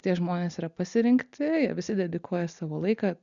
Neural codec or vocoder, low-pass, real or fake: none; 7.2 kHz; real